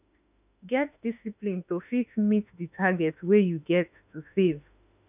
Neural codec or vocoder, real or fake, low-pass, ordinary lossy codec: autoencoder, 48 kHz, 32 numbers a frame, DAC-VAE, trained on Japanese speech; fake; 3.6 kHz; none